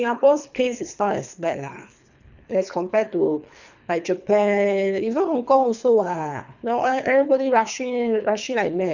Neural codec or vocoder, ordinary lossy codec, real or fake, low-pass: codec, 24 kHz, 3 kbps, HILCodec; none; fake; 7.2 kHz